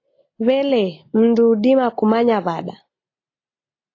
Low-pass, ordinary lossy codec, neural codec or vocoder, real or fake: 7.2 kHz; AAC, 32 kbps; none; real